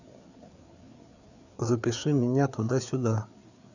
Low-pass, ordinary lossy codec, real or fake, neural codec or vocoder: 7.2 kHz; none; fake; codec, 16 kHz, 4 kbps, FreqCodec, larger model